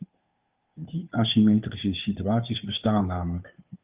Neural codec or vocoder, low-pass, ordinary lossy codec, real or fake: codec, 16 kHz, 2 kbps, FunCodec, trained on Chinese and English, 25 frames a second; 3.6 kHz; Opus, 24 kbps; fake